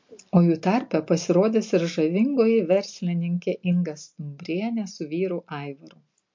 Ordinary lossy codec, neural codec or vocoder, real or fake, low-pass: MP3, 48 kbps; none; real; 7.2 kHz